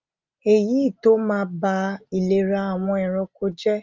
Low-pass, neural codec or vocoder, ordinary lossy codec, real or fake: 7.2 kHz; none; Opus, 24 kbps; real